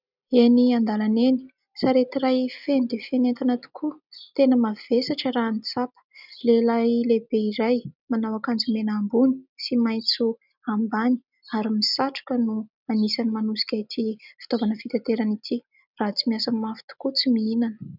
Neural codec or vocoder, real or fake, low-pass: none; real; 5.4 kHz